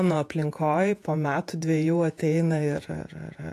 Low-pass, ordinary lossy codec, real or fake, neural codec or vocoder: 14.4 kHz; AAC, 64 kbps; fake; vocoder, 48 kHz, 128 mel bands, Vocos